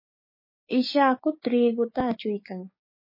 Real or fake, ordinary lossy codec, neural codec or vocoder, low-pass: fake; MP3, 24 kbps; codec, 16 kHz, 6 kbps, DAC; 5.4 kHz